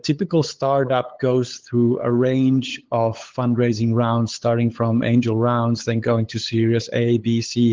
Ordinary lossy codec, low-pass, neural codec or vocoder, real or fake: Opus, 16 kbps; 7.2 kHz; codec, 16 kHz, 8 kbps, FunCodec, trained on LibriTTS, 25 frames a second; fake